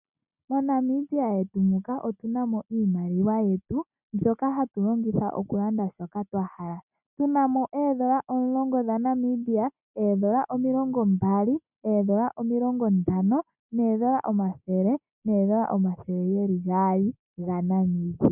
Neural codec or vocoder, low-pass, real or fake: none; 3.6 kHz; real